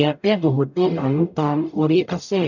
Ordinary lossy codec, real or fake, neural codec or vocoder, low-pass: none; fake; codec, 44.1 kHz, 0.9 kbps, DAC; 7.2 kHz